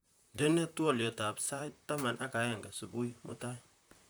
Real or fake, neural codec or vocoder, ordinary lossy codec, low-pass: fake; vocoder, 44.1 kHz, 128 mel bands, Pupu-Vocoder; none; none